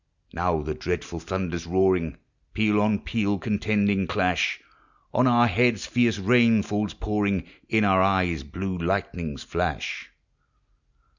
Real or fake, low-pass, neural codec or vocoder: real; 7.2 kHz; none